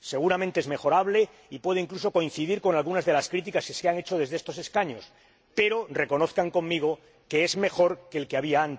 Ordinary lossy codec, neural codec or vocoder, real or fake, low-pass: none; none; real; none